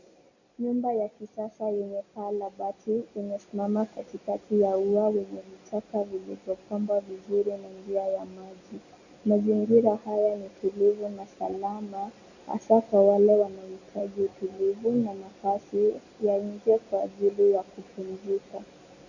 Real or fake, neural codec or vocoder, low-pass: real; none; 7.2 kHz